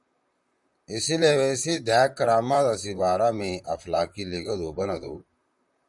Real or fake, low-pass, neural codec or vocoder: fake; 10.8 kHz; vocoder, 44.1 kHz, 128 mel bands, Pupu-Vocoder